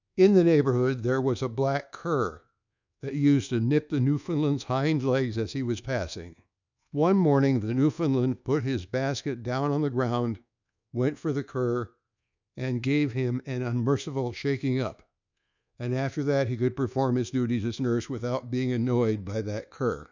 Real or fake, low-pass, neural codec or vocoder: fake; 7.2 kHz; codec, 24 kHz, 1.2 kbps, DualCodec